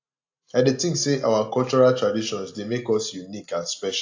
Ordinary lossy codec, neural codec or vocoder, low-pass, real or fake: AAC, 48 kbps; none; 7.2 kHz; real